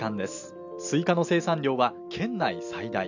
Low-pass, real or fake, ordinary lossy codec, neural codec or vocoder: 7.2 kHz; real; none; none